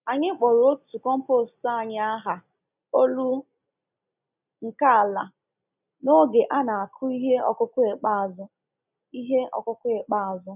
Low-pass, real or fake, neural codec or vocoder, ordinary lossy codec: 3.6 kHz; real; none; none